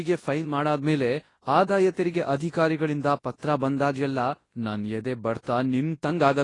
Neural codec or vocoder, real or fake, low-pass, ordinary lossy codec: codec, 24 kHz, 0.9 kbps, WavTokenizer, large speech release; fake; 10.8 kHz; AAC, 32 kbps